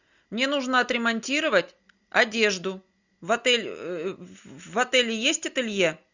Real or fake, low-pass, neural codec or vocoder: real; 7.2 kHz; none